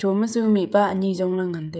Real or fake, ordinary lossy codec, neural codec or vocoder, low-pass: fake; none; codec, 16 kHz, 16 kbps, FreqCodec, smaller model; none